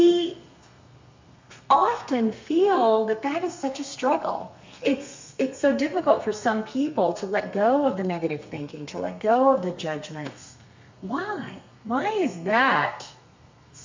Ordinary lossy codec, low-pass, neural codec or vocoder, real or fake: MP3, 64 kbps; 7.2 kHz; codec, 32 kHz, 1.9 kbps, SNAC; fake